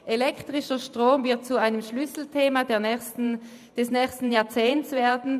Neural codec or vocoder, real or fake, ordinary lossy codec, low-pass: vocoder, 44.1 kHz, 128 mel bands every 512 samples, BigVGAN v2; fake; none; 14.4 kHz